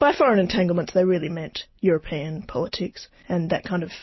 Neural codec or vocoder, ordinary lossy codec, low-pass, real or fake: none; MP3, 24 kbps; 7.2 kHz; real